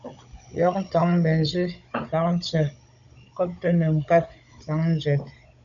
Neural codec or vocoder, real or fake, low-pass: codec, 16 kHz, 16 kbps, FunCodec, trained on Chinese and English, 50 frames a second; fake; 7.2 kHz